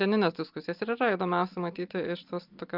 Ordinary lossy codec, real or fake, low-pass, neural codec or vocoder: Opus, 24 kbps; real; 5.4 kHz; none